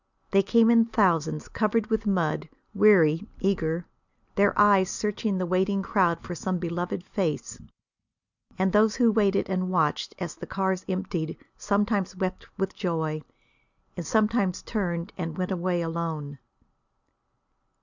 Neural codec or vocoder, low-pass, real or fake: none; 7.2 kHz; real